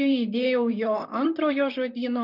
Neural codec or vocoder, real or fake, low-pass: vocoder, 44.1 kHz, 128 mel bands, Pupu-Vocoder; fake; 5.4 kHz